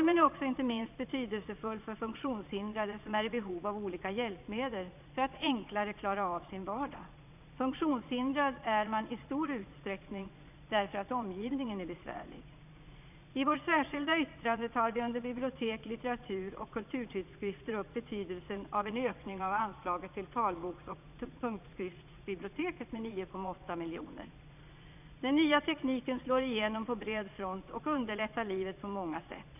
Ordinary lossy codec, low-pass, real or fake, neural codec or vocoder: none; 3.6 kHz; fake; vocoder, 22.05 kHz, 80 mel bands, Vocos